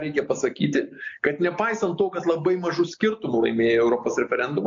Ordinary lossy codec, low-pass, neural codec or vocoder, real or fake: MP3, 48 kbps; 7.2 kHz; none; real